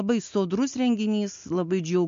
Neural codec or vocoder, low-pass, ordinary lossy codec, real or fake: none; 7.2 kHz; MP3, 48 kbps; real